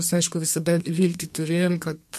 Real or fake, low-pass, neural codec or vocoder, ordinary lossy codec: fake; 14.4 kHz; codec, 32 kHz, 1.9 kbps, SNAC; MP3, 64 kbps